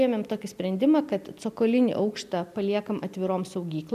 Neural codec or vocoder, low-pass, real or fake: none; 14.4 kHz; real